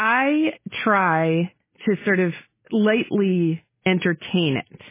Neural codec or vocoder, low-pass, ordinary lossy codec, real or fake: none; 3.6 kHz; MP3, 16 kbps; real